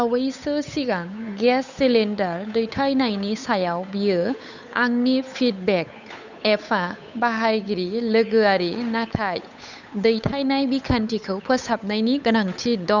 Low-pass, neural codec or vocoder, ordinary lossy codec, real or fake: 7.2 kHz; codec, 16 kHz, 8 kbps, FunCodec, trained on Chinese and English, 25 frames a second; none; fake